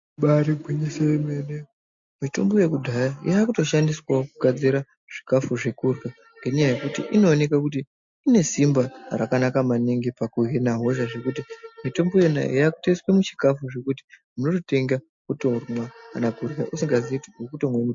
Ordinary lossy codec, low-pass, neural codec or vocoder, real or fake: MP3, 48 kbps; 7.2 kHz; none; real